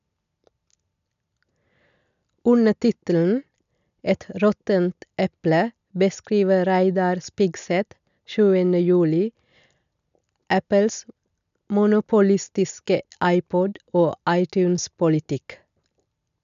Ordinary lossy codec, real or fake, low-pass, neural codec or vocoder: none; real; 7.2 kHz; none